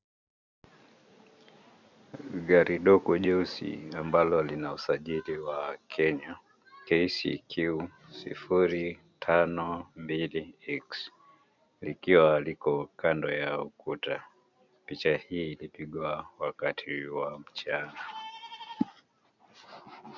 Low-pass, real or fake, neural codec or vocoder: 7.2 kHz; real; none